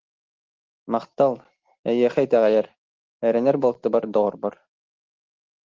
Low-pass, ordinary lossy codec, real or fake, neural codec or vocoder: 7.2 kHz; Opus, 16 kbps; real; none